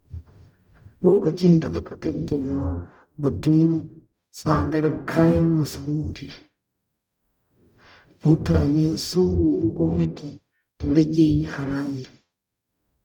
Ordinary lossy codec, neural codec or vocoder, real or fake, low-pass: none; codec, 44.1 kHz, 0.9 kbps, DAC; fake; 19.8 kHz